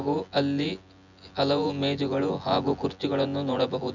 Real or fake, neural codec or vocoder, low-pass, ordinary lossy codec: fake; vocoder, 24 kHz, 100 mel bands, Vocos; 7.2 kHz; none